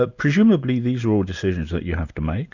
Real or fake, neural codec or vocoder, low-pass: real; none; 7.2 kHz